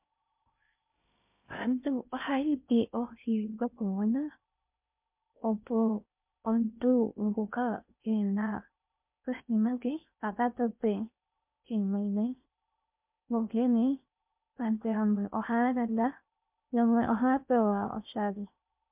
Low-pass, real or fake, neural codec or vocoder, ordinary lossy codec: 3.6 kHz; fake; codec, 16 kHz in and 24 kHz out, 0.6 kbps, FocalCodec, streaming, 2048 codes; MP3, 32 kbps